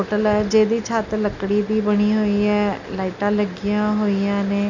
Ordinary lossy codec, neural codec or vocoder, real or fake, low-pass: none; none; real; 7.2 kHz